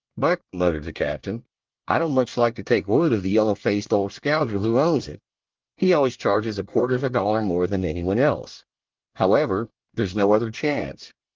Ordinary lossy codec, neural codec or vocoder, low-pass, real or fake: Opus, 24 kbps; codec, 24 kHz, 1 kbps, SNAC; 7.2 kHz; fake